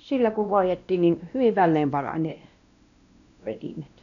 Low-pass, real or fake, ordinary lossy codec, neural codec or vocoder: 7.2 kHz; fake; none; codec, 16 kHz, 1 kbps, X-Codec, WavLM features, trained on Multilingual LibriSpeech